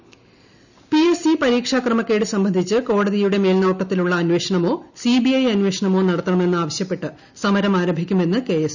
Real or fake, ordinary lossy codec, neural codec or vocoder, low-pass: real; none; none; 7.2 kHz